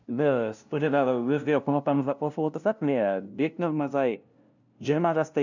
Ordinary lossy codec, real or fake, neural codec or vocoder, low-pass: none; fake; codec, 16 kHz, 0.5 kbps, FunCodec, trained on LibriTTS, 25 frames a second; 7.2 kHz